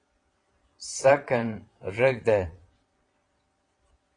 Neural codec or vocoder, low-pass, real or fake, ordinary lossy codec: vocoder, 22.05 kHz, 80 mel bands, Vocos; 9.9 kHz; fake; AAC, 32 kbps